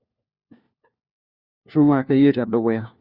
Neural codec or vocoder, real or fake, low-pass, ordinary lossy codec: codec, 16 kHz, 1 kbps, FunCodec, trained on LibriTTS, 50 frames a second; fake; 5.4 kHz; Opus, 64 kbps